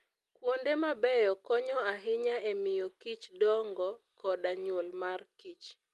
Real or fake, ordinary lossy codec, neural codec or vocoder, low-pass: real; Opus, 32 kbps; none; 14.4 kHz